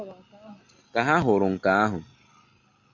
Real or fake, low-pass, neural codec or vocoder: real; 7.2 kHz; none